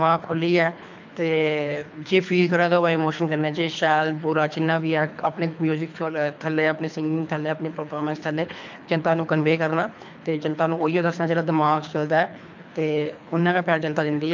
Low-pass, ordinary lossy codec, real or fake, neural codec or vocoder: 7.2 kHz; MP3, 64 kbps; fake; codec, 24 kHz, 3 kbps, HILCodec